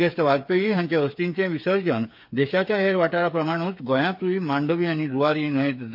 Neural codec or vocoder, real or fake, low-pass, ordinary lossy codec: codec, 16 kHz, 8 kbps, FreqCodec, smaller model; fake; 5.4 kHz; MP3, 32 kbps